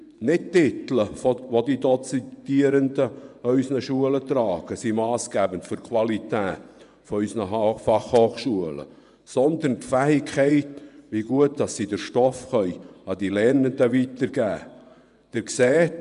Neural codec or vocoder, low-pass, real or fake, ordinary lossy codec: vocoder, 24 kHz, 100 mel bands, Vocos; 10.8 kHz; fake; none